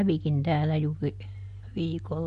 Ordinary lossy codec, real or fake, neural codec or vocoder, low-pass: MP3, 48 kbps; real; none; 14.4 kHz